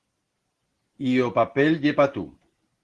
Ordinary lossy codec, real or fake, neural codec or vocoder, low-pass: Opus, 16 kbps; real; none; 10.8 kHz